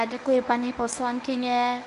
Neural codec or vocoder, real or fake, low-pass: codec, 24 kHz, 0.9 kbps, WavTokenizer, medium speech release version 1; fake; 10.8 kHz